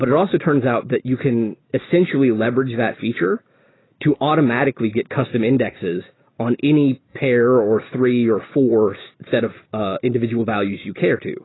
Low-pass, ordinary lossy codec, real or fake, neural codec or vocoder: 7.2 kHz; AAC, 16 kbps; real; none